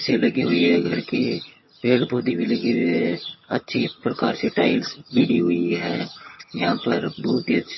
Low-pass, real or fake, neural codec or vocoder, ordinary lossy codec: 7.2 kHz; fake; vocoder, 22.05 kHz, 80 mel bands, HiFi-GAN; MP3, 24 kbps